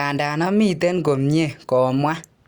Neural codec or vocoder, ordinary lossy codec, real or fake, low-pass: none; Opus, 64 kbps; real; 19.8 kHz